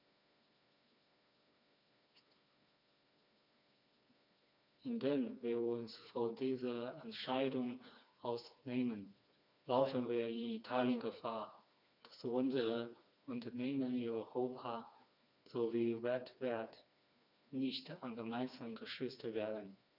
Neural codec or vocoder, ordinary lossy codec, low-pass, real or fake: codec, 16 kHz, 2 kbps, FreqCodec, smaller model; none; 5.4 kHz; fake